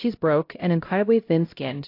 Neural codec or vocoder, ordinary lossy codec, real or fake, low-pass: codec, 16 kHz, 0.5 kbps, X-Codec, HuBERT features, trained on LibriSpeech; AAC, 32 kbps; fake; 5.4 kHz